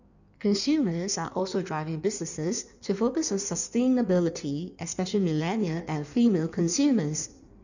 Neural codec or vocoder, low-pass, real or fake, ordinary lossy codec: codec, 16 kHz in and 24 kHz out, 1.1 kbps, FireRedTTS-2 codec; 7.2 kHz; fake; none